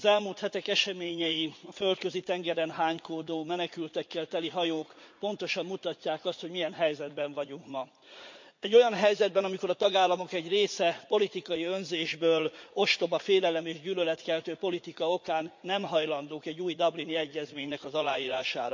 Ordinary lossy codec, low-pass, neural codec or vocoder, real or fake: MP3, 48 kbps; 7.2 kHz; vocoder, 44.1 kHz, 80 mel bands, Vocos; fake